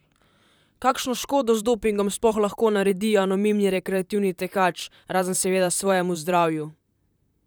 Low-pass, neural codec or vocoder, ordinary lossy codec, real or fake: none; vocoder, 44.1 kHz, 128 mel bands, Pupu-Vocoder; none; fake